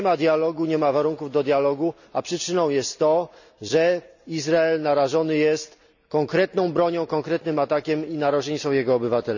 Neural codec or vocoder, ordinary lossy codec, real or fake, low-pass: none; none; real; 7.2 kHz